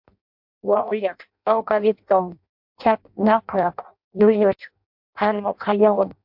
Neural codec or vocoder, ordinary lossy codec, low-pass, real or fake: codec, 16 kHz in and 24 kHz out, 0.6 kbps, FireRedTTS-2 codec; MP3, 48 kbps; 5.4 kHz; fake